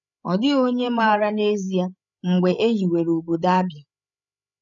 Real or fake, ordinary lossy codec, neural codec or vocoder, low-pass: fake; none; codec, 16 kHz, 8 kbps, FreqCodec, larger model; 7.2 kHz